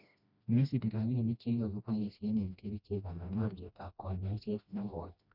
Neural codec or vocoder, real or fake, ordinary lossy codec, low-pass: codec, 16 kHz, 1 kbps, FreqCodec, smaller model; fake; none; 5.4 kHz